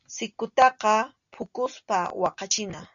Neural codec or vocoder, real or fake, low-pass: none; real; 7.2 kHz